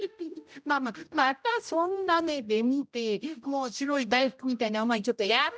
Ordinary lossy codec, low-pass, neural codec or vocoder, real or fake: none; none; codec, 16 kHz, 0.5 kbps, X-Codec, HuBERT features, trained on general audio; fake